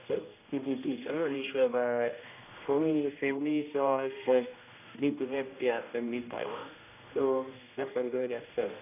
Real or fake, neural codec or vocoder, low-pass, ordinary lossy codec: fake; codec, 16 kHz, 1 kbps, X-Codec, HuBERT features, trained on general audio; 3.6 kHz; none